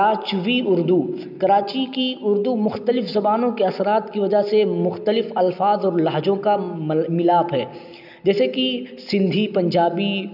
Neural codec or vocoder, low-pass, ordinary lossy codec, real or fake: none; 5.4 kHz; none; real